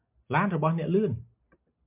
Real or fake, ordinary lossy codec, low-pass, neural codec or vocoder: real; MP3, 32 kbps; 3.6 kHz; none